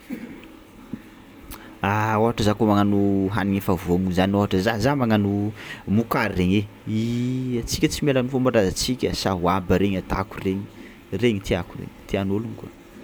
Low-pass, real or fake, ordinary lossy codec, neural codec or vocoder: none; real; none; none